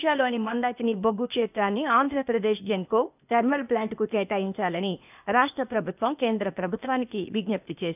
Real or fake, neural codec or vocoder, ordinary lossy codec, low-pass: fake; codec, 16 kHz, 0.8 kbps, ZipCodec; none; 3.6 kHz